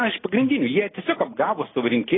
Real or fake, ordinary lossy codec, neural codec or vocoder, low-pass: real; AAC, 16 kbps; none; 7.2 kHz